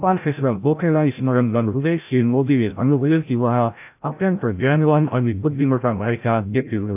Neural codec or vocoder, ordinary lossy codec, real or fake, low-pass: codec, 16 kHz, 0.5 kbps, FreqCodec, larger model; AAC, 32 kbps; fake; 3.6 kHz